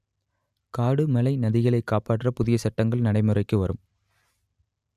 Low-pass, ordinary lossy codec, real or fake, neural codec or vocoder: 14.4 kHz; none; real; none